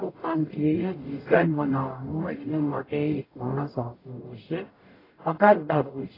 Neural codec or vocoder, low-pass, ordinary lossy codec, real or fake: codec, 44.1 kHz, 0.9 kbps, DAC; 5.4 kHz; AAC, 24 kbps; fake